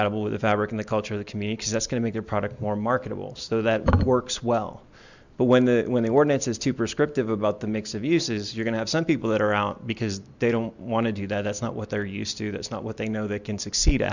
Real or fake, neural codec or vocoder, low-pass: real; none; 7.2 kHz